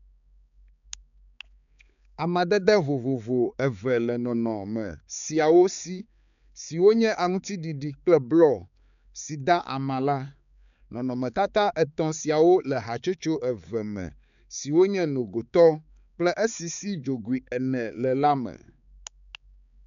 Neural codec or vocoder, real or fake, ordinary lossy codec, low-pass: codec, 16 kHz, 4 kbps, X-Codec, HuBERT features, trained on balanced general audio; fake; none; 7.2 kHz